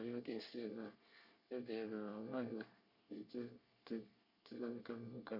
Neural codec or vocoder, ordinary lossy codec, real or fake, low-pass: codec, 24 kHz, 1 kbps, SNAC; none; fake; 5.4 kHz